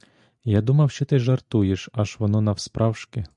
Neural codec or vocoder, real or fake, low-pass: none; real; 10.8 kHz